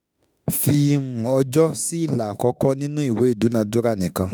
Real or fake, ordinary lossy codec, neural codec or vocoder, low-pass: fake; none; autoencoder, 48 kHz, 32 numbers a frame, DAC-VAE, trained on Japanese speech; none